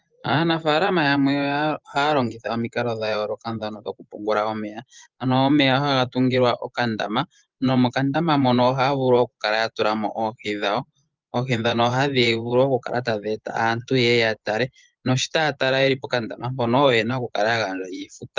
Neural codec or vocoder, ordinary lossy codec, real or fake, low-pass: vocoder, 24 kHz, 100 mel bands, Vocos; Opus, 24 kbps; fake; 7.2 kHz